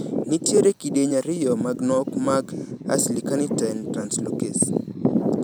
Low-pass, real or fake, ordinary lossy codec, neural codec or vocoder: none; fake; none; vocoder, 44.1 kHz, 128 mel bands every 512 samples, BigVGAN v2